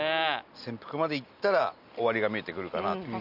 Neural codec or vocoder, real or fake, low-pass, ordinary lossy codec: none; real; 5.4 kHz; none